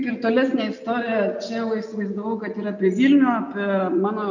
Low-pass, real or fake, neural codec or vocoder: 7.2 kHz; real; none